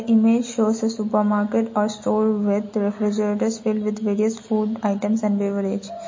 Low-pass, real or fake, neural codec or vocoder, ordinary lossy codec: 7.2 kHz; real; none; MP3, 32 kbps